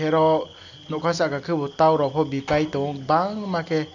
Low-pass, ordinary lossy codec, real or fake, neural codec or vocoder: 7.2 kHz; none; real; none